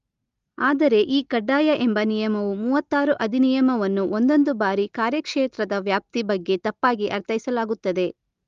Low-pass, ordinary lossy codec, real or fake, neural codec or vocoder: 7.2 kHz; Opus, 32 kbps; real; none